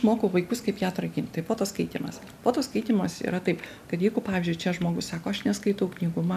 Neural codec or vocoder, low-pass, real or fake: none; 14.4 kHz; real